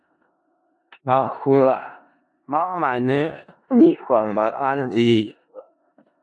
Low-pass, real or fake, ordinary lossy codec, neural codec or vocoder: 10.8 kHz; fake; AAC, 64 kbps; codec, 16 kHz in and 24 kHz out, 0.4 kbps, LongCat-Audio-Codec, four codebook decoder